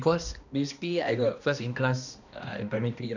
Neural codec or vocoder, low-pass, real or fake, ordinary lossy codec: codec, 16 kHz, 1 kbps, X-Codec, HuBERT features, trained on balanced general audio; 7.2 kHz; fake; none